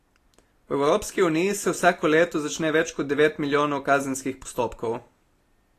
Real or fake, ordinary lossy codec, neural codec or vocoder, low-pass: real; AAC, 48 kbps; none; 14.4 kHz